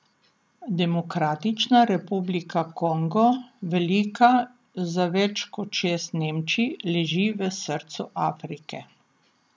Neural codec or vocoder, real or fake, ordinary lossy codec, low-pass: none; real; none; none